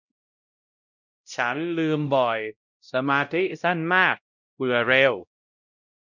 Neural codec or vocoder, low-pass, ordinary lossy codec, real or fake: codec, 16 kHz, 0.5 kbps, X-Codec, WavLM features, trained on Multilingual LibriSpeech; 7.2 kHz; none; fake